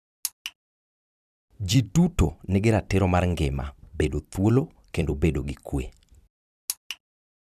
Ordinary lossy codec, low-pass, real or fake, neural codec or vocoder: none; 14.4 kHz; fake; vocoder, 44.1 kHz, 128 mel bands every 256 samples, BigVGAN v2